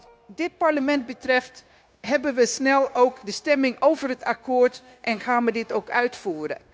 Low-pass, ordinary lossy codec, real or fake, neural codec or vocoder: none; none; fake; codec, 16 kHz, 0.9 kbps, LongCat-Audio-Codec